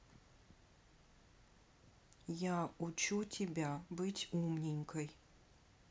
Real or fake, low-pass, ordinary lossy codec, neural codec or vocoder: real; none; none; none